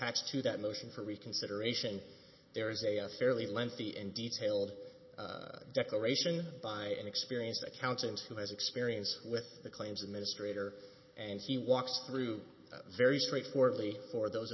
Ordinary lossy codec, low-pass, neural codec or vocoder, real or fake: MP3, 24 kbps; 7.2 kHz; none; real